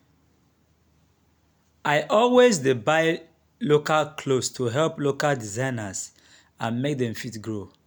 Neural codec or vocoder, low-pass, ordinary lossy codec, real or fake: none; none; none; real